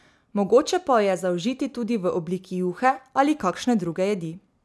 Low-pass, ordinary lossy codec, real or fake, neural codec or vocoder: none; none; real; none